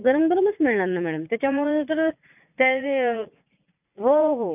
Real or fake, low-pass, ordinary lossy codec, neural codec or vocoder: fake; 3.6 kHz; none; vocoder, 44.1 kHz, 80 mel bands, Vocos